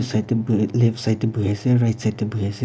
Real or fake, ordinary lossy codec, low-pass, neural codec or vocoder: real; none; none; none